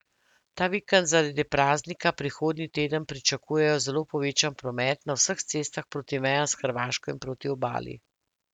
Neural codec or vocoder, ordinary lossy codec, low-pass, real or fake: none; none; 19.8 kHz; real